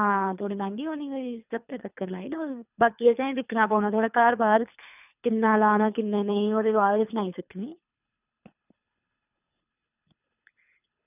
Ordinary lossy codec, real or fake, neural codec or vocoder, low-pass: none; fake; codec, 24 kHz, 3 kbps, HILCodec; 3.6 kHz